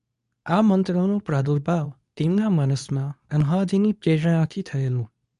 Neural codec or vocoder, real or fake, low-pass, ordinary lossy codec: codec, 24 kHz, 0.9 kbps, WavTokenizer, medium speech release version 2; fake; 10.8 kHz; none